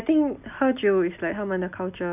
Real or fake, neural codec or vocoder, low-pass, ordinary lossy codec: real; none; 3.6 kHz; none